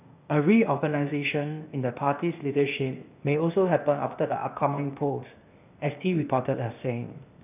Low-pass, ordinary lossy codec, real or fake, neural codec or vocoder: 3.6 kHz; none; fake; codec, 16 kHz, 0.8 kbps, ZipCodec